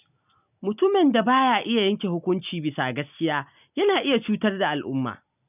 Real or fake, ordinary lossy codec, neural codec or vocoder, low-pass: real; none; none; 3.6 kHz